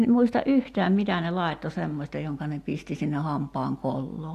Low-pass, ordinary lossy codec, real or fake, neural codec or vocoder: 14.4 kHz; AAC, 64 kbps; real; none